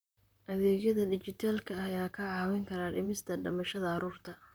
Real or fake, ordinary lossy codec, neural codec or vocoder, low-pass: fake; none; vocoder, 44.1 kHz, 128 mel bands, Pupu-Vocoder; none